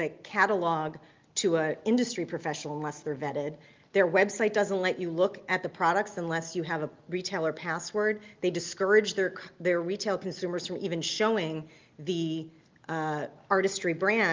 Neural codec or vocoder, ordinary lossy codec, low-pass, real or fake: none; Opus, 32 kbps; 7.2 kHz; real